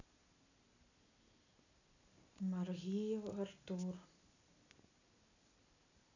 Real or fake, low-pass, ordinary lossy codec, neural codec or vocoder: real; 7.2 kHz; none; none